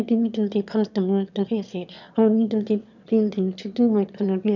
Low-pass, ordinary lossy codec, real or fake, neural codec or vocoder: 7.2 kHz; none; fake; autoencoder, 22.05 kHz, a latent of 192 numbers a frame, VITS, trained on one speaker